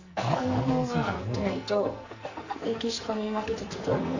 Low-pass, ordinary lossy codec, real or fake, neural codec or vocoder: 7.2 kHz; Opus, 64 kbps; fake; codec, 44.1 kHz, 2.6 kbps, SNAC